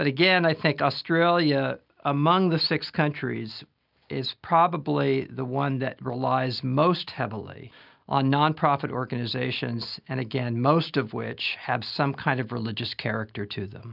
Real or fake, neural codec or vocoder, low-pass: real; none; 5.4 kHz